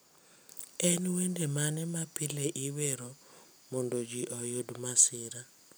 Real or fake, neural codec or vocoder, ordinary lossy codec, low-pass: real; none; none; none